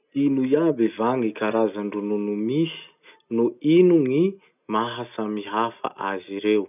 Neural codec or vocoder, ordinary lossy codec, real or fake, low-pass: none; none; real; 3.6 kHz